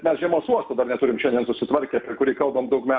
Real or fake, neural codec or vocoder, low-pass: real; none; 7.2 kHz